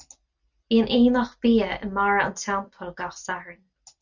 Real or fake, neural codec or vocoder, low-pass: real; none; 7.2 kHz